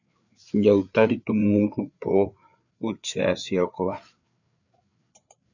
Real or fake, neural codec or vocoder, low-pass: fake; codec, 16 kHz, 4 kbps, FreqCodec, larger model; 7.2 kHz